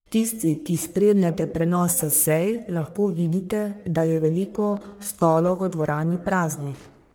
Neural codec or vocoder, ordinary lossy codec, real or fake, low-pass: codec, 44.1 kHz, 1.7 kbps, Pupu-Codec; none; fake; none